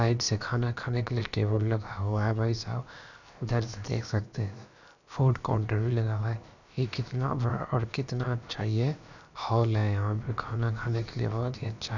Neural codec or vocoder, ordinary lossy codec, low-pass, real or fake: codec, 16 kHz, about 1 kbps, DyCAST, with the encoder's durations; none; 7.2 kHz; fake